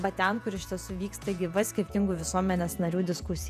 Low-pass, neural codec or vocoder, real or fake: 14.4 kHz; vocoder, 48 kHz, 128 mel bands, Vocos; fake